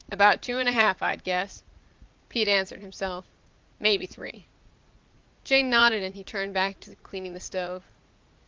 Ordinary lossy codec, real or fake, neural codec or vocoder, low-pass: Opus, 24 kbps; fake; vocoder, 44.1 kHz, 80 mel bands, Vocos; 7.2 kHz